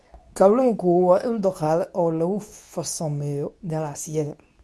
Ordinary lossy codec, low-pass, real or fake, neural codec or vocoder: none; none; fake; codec, 24 kHz, 0.9 kbps, WavTokenizer, medium speech release version 2